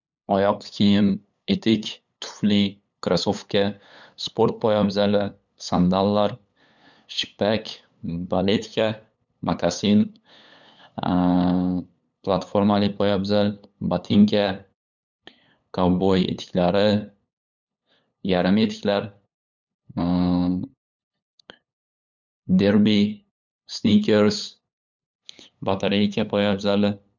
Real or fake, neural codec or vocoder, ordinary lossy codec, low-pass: fake; codec, 16 kHz, 8 kbps, FunCodec, trained on LibriTTS, 25 frames a second; none; 7.2 kHz